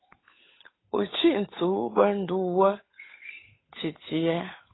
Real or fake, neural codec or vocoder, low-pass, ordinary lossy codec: fake; codec, 16 kHz, 8 kbps, FunCodec, trained on Chinese and English, 25 frames a second; 7.2 kHz; AAC, 16 kbps